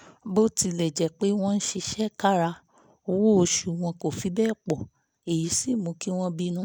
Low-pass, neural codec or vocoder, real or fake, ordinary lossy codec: none; none; real; none